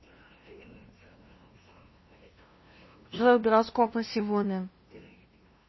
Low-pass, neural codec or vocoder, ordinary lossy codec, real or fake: 7.2 kHz; codec, 16 kHz, 0.5 kbps, FunCodec, trained on LibriTTS, 25 frames a second; MP3, 24 kbps; fake